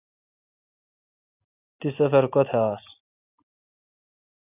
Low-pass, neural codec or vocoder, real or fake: 3.6 kHz; none; real